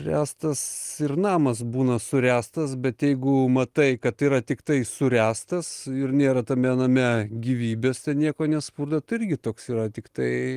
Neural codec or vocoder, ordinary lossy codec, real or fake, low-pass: none; Opus, 24 kbps; real; 14.4 kHz